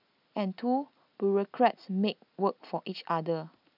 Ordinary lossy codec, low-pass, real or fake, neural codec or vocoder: none; 5.4 kHz; real; none